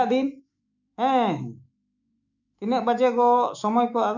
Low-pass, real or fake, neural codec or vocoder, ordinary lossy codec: 7.2 kHz; fake; autoencoder, 48 kHz, 128 numbers a frame, DAC-VAE, trained on Japanese speech; none